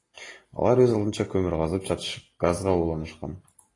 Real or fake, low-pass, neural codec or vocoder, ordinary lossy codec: real; 10.8 kHz; none; AAC, 32 kbps